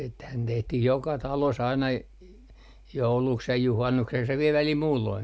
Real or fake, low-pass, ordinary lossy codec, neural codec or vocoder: real; none; none; none